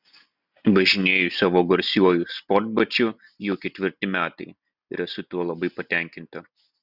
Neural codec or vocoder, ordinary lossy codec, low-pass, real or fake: none; Opus, 64 kbps; 5.4 kHz; real